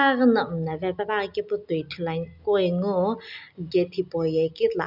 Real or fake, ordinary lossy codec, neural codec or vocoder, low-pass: real; none; none; 5.4 kHz